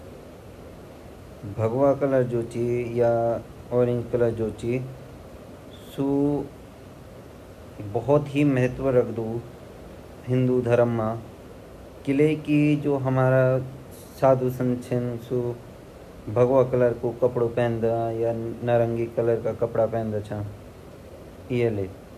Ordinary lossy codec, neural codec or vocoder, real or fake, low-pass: none; none; real; 14.4 kHz